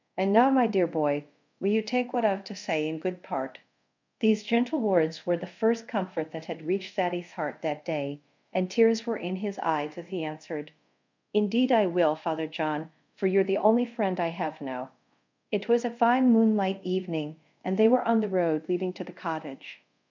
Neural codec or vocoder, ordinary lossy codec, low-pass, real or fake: codec, 24 kHz, 0.5 kbps, DualCodec; MP3, 64 kbps; 7.2 kHz; fake